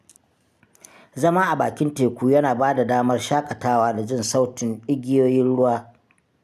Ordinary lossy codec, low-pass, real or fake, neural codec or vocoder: none; 14.4 kHz; real; none